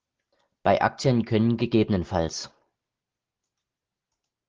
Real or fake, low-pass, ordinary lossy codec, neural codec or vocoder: real; 7.2 kHz; Opus, 16 kbps; none